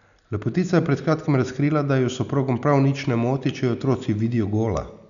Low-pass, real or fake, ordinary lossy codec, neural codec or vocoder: 7.2 kHz; real; none; none